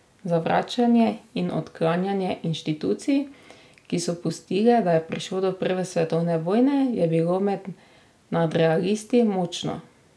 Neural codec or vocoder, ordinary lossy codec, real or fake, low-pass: none; none; real; none